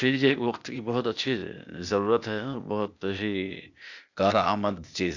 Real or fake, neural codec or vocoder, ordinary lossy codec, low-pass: fake; codec, 16 kHz, 0.8 kbps, ZipCodec; none; 7.2 kHz